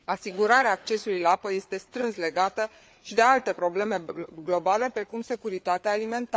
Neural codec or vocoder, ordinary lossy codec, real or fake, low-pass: codec, 16 kHz, 4 kbps, FreqCodec, larger model; none; fake; none